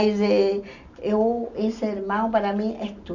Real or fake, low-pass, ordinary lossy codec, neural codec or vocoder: real; 7.2 kHz; none; none